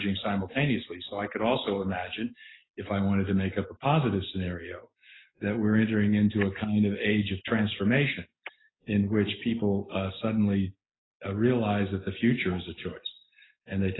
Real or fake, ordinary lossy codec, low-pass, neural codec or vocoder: real; AAC, 16 kbps; 7.2 kHz; none